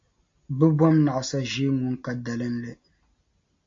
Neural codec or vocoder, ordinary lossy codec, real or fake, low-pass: none; MP3, 48 kbps; real; 7.2 kHz